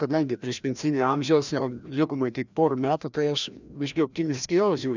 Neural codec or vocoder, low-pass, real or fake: codec, 16 kHz, 1 kbps, FreqCodec, larger model; 7.2 kHz; fake